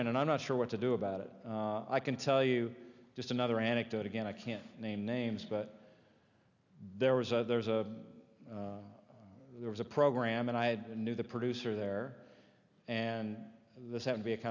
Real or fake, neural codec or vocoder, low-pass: real; none; 7.2 kHz